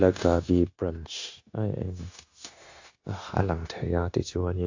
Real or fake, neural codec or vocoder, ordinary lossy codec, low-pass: fake; codec, 16 kHz, 0.9 kbps, LongCat-Audio-Codec; AAC, 48 kbps; 7.2 kHz